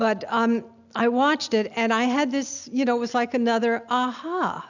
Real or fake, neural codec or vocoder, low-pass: real; none; 7.2 kHz